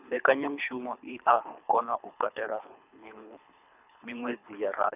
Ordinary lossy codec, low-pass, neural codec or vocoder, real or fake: none; 3.6 kHz; codec, 24 kHz, 3 kbps, HILCodec; fake